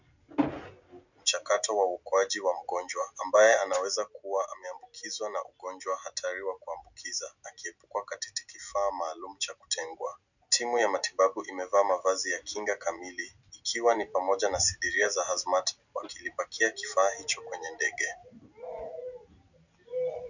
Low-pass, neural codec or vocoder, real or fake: 7.2 kHz; none; real